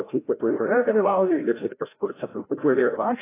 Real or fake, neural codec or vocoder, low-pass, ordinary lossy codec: fake; codec, 16 kHz, 0.5 kbps, FreqCodec, larger model; 3.6 kHz; AAC, 16 kbps